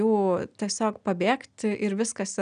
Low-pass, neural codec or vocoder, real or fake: 9.9 kHz; none; real